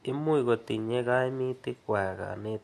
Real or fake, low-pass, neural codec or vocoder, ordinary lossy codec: real; 14.4 kHz; none; AAC, 64 kbps